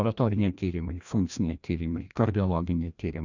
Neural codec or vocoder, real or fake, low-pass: codec, 16 kHz, 1 kbps, FreqCodec, larger model; fake; 7.2 kHz